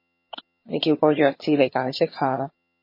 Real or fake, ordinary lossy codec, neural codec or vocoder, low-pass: fake; MP3, 24 kbps; vocoder, 22.05 kHz, 80 mel bands, HiFi-GAN; 5.4 kHz